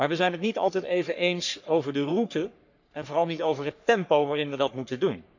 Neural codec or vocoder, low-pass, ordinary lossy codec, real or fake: codec, 44.1 kHz, 3.4 kbps, Pupu-Codec; 7.2 kHz; none; fake